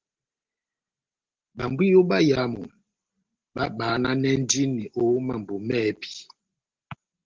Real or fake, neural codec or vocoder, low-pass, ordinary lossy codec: real; none; 7.2 kHz; Opus, 16 kbps